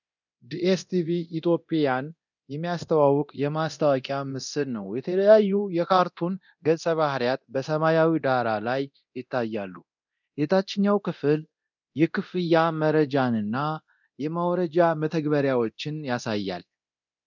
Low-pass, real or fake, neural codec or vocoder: 7.2 kHz; fake; codec, 24 kHz, 0.9 kbps, DualCodec